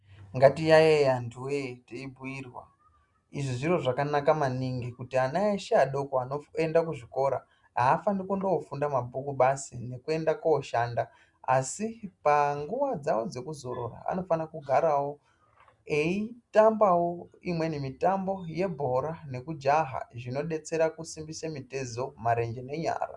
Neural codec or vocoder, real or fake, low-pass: none; real; 10.8 kHz